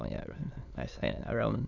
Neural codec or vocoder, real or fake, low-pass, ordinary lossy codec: autoencoder, 22.05 kHz, a latent of 192 numbers a frame, VITS, trained on many speakers; fake; 7.2 kHz; none